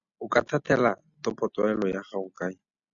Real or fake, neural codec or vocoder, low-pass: real; none; 7.2 kHz